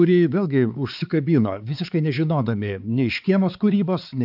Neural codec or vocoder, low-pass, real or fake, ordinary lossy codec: codec, 16 kHz, 4 kbps, X-Codec, HuBERT features, trained on balanced general audio; 5.4 kHz; fake; AAC, 48 kbps